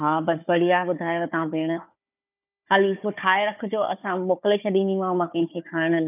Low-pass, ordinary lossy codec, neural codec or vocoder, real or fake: 3.6 kHz; none; codec, 16 kHz, 4 kbps, FunCodec, trained on Chinese and English, 50 frames a second; fake